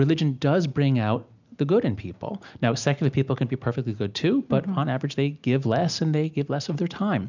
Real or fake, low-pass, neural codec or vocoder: real; 7.2 kHz; none